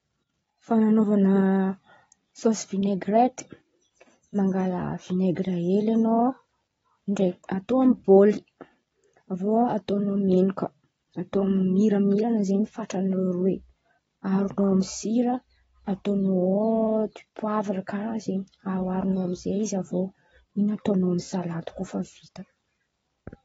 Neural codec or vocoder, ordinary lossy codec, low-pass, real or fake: none; AAC, 24 kbps; 19.8 kHz; real